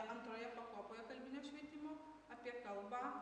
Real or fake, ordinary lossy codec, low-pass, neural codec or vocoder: real; MP3, 96 kbps; 9.9 kHz; none